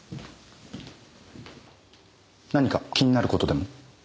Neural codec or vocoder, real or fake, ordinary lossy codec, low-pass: none; real; none; none